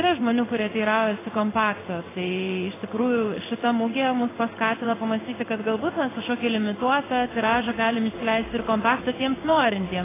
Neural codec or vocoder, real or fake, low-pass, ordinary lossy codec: codec, 16 kHz in and 24 kHz out, 1 kbps, XY-Tokenizer; fake; 3.6 kHz; AAC, 16 kbps